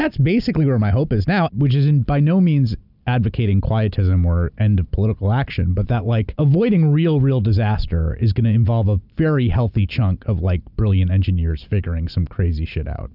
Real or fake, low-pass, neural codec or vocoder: real; 5.4 kHz; none